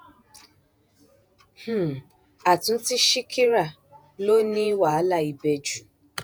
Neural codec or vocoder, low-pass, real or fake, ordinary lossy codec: vocoder, 48 kHz, 128 mel bands, Vocos; none; fake; none